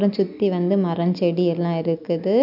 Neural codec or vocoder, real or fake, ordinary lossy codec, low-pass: none; real; none; 5.4 kHz